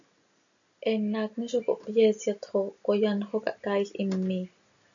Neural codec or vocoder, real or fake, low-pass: none; real; 7.2 kHz